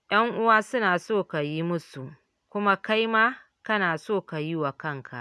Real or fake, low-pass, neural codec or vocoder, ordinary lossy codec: real; none; none; none